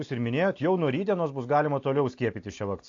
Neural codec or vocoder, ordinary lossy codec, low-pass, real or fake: none; AAC, 48 kbps; 7.2 kHz; real